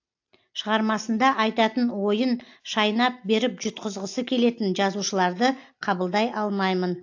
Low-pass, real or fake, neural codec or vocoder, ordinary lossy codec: 7.2 kHz; real; none; AAC, 48 kbps